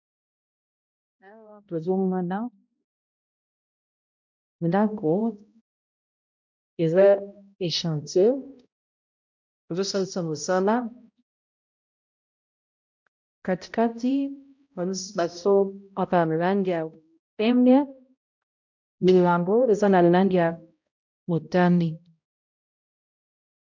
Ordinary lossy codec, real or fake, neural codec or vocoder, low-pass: MP3, 64 kbps; fake; codec, 16 kHz, 0.5 kbps, X-Codec, HuBERT features, trained on balanced general audio; 7.2 kHz